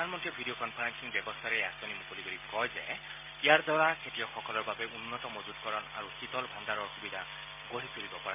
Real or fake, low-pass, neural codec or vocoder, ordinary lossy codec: real; 3.6 kHz; none; MP3, 24 kbps